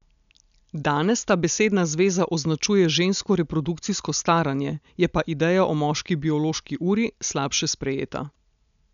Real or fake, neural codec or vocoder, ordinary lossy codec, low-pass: real; none; none; 7.2 kHz